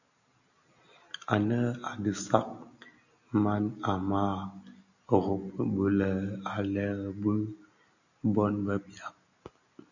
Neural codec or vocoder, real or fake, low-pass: none; real; 7.2 kHz